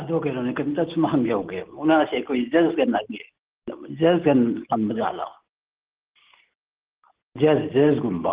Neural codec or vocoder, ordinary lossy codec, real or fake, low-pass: none; Opus, 16 kbps; real; 3.6 kHz